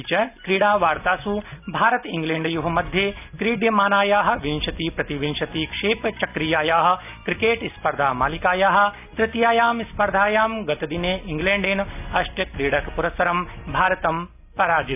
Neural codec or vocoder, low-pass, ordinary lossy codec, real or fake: none; 3.6 kHz; none; real